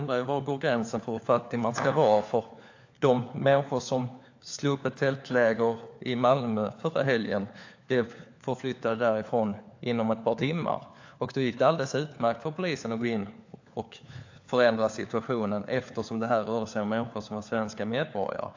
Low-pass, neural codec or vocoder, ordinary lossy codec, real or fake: 7.2 kHz; codec, 16 kHz, 4 kbps, FunCodec, trained on LibriTTS, 50 frames a second; AAC, 48 kbps; fake